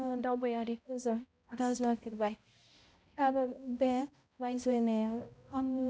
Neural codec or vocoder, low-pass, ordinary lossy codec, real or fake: codec, 16 kHz, 0.5 kbps, X-Codec, HuBERT features, trained on balanced general audio; none; none; fake